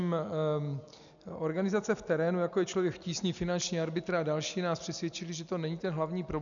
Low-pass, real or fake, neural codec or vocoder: 7.2 kHz; real; none